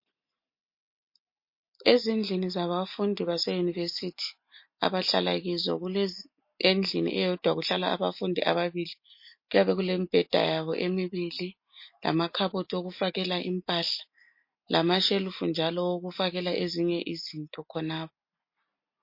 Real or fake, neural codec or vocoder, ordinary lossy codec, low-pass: real; none; MP3, 32 kbps; 5.4 kHz